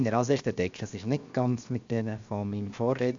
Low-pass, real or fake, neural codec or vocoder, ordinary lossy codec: 7.2 kHz; fake; codec, 16 kHz, 0.7 kbps, FocalCodec; AAC, 64 kbps